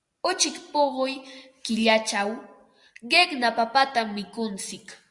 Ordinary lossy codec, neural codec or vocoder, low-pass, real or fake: Opus, 64 kbps; none; 10.8 kHz; real